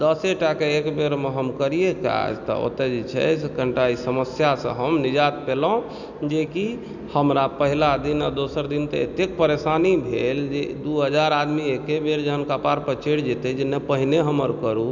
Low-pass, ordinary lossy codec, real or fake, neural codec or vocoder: 7.2 kHz; none; real; none